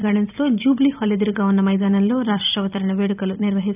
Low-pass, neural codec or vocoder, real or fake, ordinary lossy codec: 3.6 kHz; vocoder, 44.1 kHz, 128 mel bands every 256 samples, BigVGAN v2; fake; none